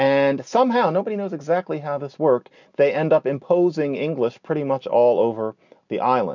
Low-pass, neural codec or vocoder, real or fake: 7.2 kHz; none; real